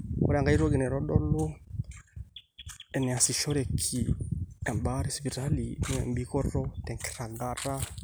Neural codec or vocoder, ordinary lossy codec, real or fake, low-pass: none; none; real; none